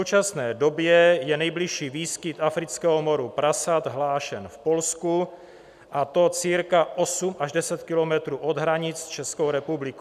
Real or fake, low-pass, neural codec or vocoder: real; 14.4 kHz; none